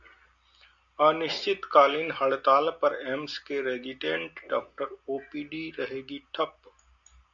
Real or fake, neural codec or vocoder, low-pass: real; none; 7.2 kHz